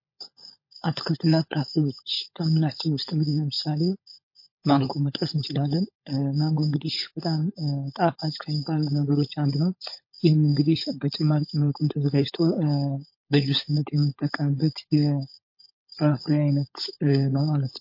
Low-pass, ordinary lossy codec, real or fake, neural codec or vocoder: 5.4 kHz; MP3, 32 kbps; fake; codec, 16 kHz, 16 kbps, FunCodec, trained on LibriTTS, 50 frames a second